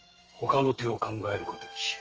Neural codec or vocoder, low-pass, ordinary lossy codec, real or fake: codec, 32 kHz, 1.9 kbps, SNAC; 7.2 kHz; Opus, 24 kbps; fake